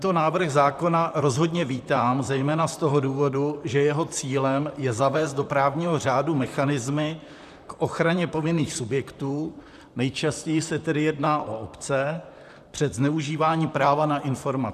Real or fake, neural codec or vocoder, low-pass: fake; vocoder, 44.1 kHz, 128 mel bands, Pupu-Vocoder; 14.4 kHz